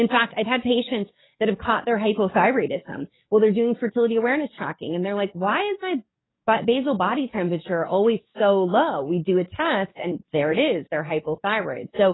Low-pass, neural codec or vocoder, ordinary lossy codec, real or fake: 7.2 kHz; codec, 44.1 kHz, 7.8 kbps, DAC; AAC, 16 kbps; fake